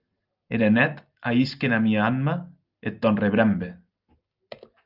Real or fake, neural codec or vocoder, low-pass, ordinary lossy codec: real; none; 5.4 kHz; Opus, 24 kbps